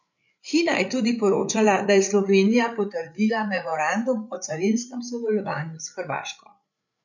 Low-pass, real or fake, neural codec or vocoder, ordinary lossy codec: 7.2 kHz; fake; codec, 16 kHz, 8 kbps, FreqCodec, larger model; none